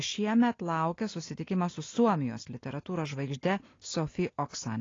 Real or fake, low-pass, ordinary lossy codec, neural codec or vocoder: real; 7.2 kHz; AAC, 32 kbps; none